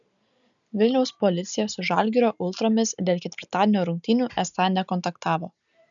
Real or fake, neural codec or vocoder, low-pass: real; none; 7.2 kHz